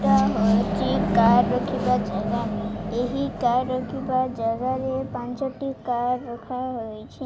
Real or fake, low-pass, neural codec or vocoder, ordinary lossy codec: real; none; none; none